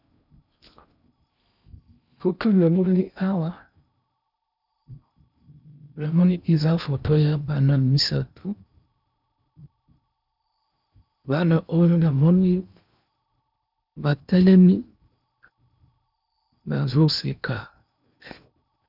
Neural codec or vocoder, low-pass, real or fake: codec, 16 kHz in and 24 kHz out, 0.6 kbps, FocalCodec, streaming, 2048 codes; 5.4 kHz; fake